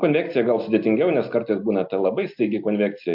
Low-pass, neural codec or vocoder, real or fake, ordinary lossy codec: 5.4 kHz; none; real; MP3, 48 kbps